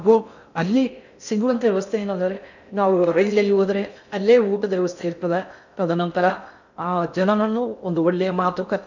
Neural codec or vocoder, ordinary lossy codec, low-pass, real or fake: codec, 16 kHz in and 24 kHz out, 0.6 kbps, FocalCodec, streaming, 2048 codes; none; 7.2 kHz; fake